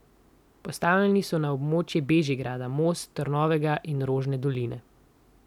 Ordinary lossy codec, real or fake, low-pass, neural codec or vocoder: none; real; 19.8 kHz; none